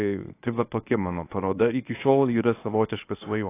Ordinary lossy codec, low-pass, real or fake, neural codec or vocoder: AAC, 24 kbps; 3.6 kHz; fake; codec, 24 kHz, 0.9 kbps, WavTokenizer, medium speech release version 1